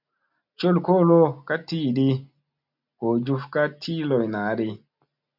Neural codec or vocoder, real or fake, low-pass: none; real; 5.4 kHz